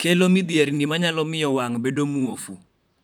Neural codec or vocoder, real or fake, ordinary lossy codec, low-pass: vocoder, 44.1 kHz, 128 mel bands, Pupu-Vocoder; fake; none; none